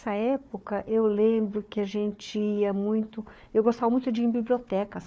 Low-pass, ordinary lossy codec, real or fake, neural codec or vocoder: none; none; fake; codec, 16 kHz, 4 kbps, FunCodec, trained on Chinese and English, 50 frames a second